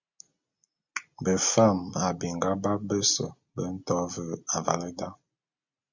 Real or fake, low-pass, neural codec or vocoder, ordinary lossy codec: real; 7.2 kHz; none; Opus, 64 kbps